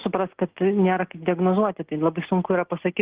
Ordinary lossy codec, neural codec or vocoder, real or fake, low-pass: Opus, 16 kbps; none; real; 3.6 kHz